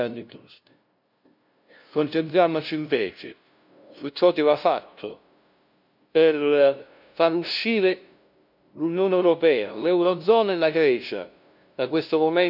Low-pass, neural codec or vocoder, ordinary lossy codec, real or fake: 5.4 kHz; codec, 16 kHz, 0.5 kbps, FunCodec, trained on LibriTTS, 25 frames a second; none; fake